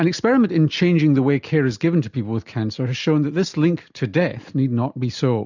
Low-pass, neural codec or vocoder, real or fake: 7.2 kHz; none; real